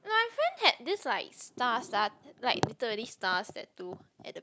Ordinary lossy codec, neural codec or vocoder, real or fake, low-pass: none; none; real; none